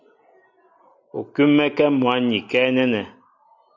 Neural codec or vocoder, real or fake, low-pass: none; real; 7.2 kHz